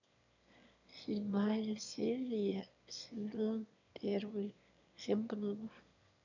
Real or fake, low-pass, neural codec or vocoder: fake; 7.2 kHz; autoencoder, 22.05 kHz, a latent of 192 numbers a frame, VITS, trained on one speaker